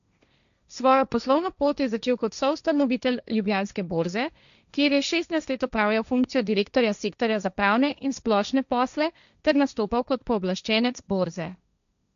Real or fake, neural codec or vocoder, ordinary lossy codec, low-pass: fake; codec, 16 kHz, 1.1 kbps, Voila-Tokenizer; none; 7.2 kHz